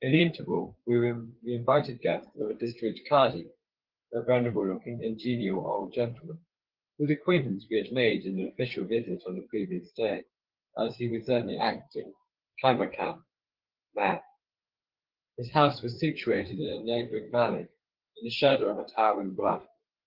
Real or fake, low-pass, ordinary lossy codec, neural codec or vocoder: fake; 5.4 kHz; Opus, 16 kbps; codec, 16 kHz, 4 kbps, FreqCodec, larger model